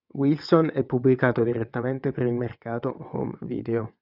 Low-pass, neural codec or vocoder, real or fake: 5.4 kHz; vocoder, 22.05 kHz, 80 mel bands, WaveNeXt; fake